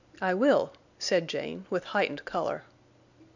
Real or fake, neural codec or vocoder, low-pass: real; none; 7.2 kHz